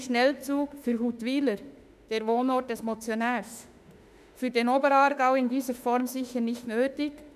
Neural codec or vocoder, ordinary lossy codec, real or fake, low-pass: autoencoder, 48 kHz, 32 numbers a frame, DAC-VAE, trained on Japanese speech; none; fake; 14.4 kHz